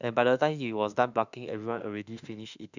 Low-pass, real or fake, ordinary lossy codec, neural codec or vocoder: 7.2 kHz; fake; none; autoencoder, 48 kHz, 32 numbers a frame, DAC-VAE, trained on Japanese speech